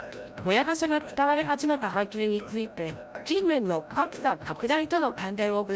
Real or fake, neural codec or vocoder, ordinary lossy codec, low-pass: fake; codec, 16 kHz, 0.5 kbps, FreqCodec, larger model; none; none